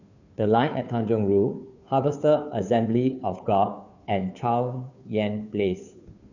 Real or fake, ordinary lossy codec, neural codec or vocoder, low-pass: fake; none; codec, 16 kHz, 2 kbps, FunCodec, trained on Chinese and English, 25 frames a second; 7.2 kHz